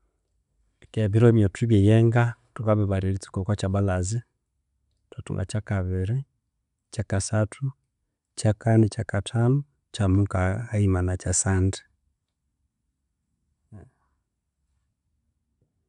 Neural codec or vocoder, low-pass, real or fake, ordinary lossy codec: none; 10.8 kHz; real; none